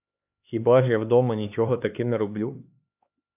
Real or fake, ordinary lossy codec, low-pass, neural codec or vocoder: fake; AAC, 32 kbps; 3.6 kHz; codec, 16 kHz, 2 kbps, X-Codec, HuBERT features, trained on LibriSpeech